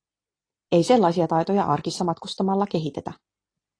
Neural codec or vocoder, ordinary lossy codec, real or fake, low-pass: none; AAC, 32 kbps; real; 9.9 kHz